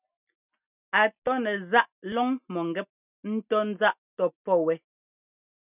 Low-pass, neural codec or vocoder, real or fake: 3.6 kHz; none; real